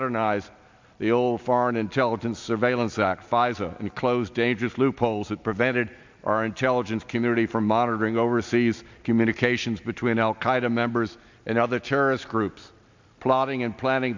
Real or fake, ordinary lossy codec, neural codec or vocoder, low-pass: real; MP3, 64 kbps; none; 7.2 kHz